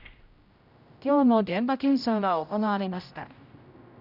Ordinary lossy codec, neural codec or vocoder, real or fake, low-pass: none; codec, 16 kHz, 0.5 kbps, X-Codec, HuBERT features, trained on general audio; fake; 5.4 kHz